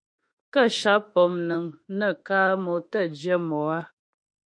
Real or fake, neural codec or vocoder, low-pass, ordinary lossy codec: fake; autoencoder, 48 kHz, 32 numbers a frame, DAC-VAE, trained on Japanese speech; 9.9 kHz; MP3, 48 kbps